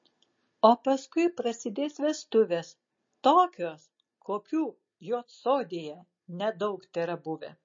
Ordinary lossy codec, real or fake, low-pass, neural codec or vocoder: MP3, 32 kbps; real; 7.2 kHz; none